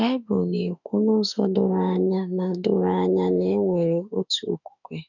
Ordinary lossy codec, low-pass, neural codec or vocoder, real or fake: none; 7.2 kHz; autoencoder, 48 kHz, 32 numbers a frame, DAC-VAE, trained on Japanese speech; fake